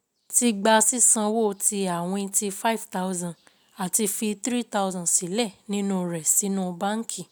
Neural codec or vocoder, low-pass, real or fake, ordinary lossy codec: none; none; real; none